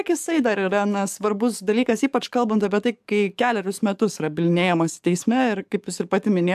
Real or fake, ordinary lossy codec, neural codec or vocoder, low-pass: fake; AAC, 96 kbps; codec, 44.1 kHz, 7.8 kbps, DAC; 14.4 kHz